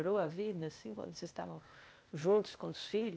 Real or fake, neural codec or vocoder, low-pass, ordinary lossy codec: fake; codec, 16 kHz, 0.8 kbps, ZipCodec; none; none